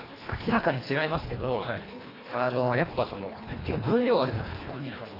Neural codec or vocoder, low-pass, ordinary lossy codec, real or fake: codec, 24 kHz, 1.5 kbps, HILCodec; 5.4 kHz; AAC, 24 kbps; fake